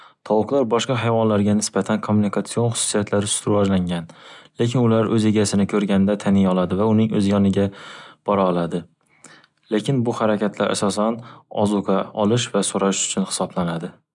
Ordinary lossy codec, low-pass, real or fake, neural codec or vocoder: none; 10.8 kHz; real; none